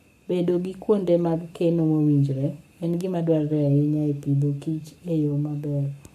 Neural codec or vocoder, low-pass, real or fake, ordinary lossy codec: codec, 44.1 kHz, 7.8 kbps, Pupu-Codec; 14.4 kHz; fake; none